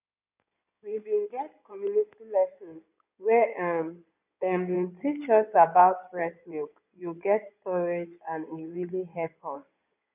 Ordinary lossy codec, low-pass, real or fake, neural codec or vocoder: none; 3.6 kHz; fake; codec, 16 kHz in and 24 kHz out, 2.2 kbps, FireRedTTS-2 codec